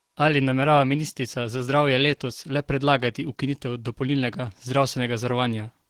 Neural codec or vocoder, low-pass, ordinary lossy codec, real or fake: codec, 44.1 kHz, 7.8 kbps, DAC; 19.8 kHz; Opus, 16 kbps; fake